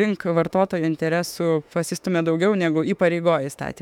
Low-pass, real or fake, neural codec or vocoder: 19.8 kHz; fake; autoencoder, 48 kHz, 32 numbers a frame, DAC-VAE, trained on Japanese speech